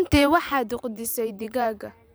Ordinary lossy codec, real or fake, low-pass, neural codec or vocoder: none; fake; none; vocoder, 44.1 kHz, 128 mel bands every 512 samples, BigVGAN v2